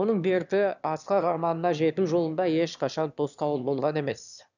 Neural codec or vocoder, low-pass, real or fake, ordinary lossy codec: autoencoder, 22.05 kHz, a latent of 192 numbers a frame, VITS, trained on one speaker; 7.2 kHz; fake; none